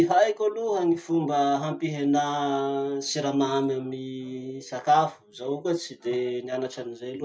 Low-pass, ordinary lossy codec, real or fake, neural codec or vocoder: none; none; real; none